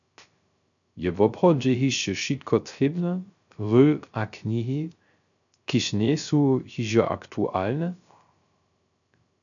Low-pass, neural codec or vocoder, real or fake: 7.2 kHz; codec, 16 kHz, 0.3 kbps, FocalCodec; fake